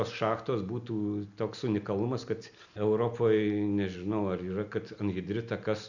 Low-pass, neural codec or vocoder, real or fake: 7.2 kHz; none; real